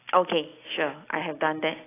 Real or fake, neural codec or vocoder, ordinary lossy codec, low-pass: real; none; AAC, 16 kbps; 3.6 kHz